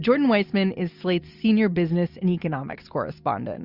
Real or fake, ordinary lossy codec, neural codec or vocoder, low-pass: real; Opus, 64 kbps; none; 5.4 kHz